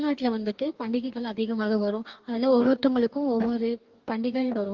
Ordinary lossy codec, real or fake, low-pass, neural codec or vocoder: Opus, 32 kbps; fake; 7.2 kHz; codec, 44.1 kHz, 2.6 kbps, DAC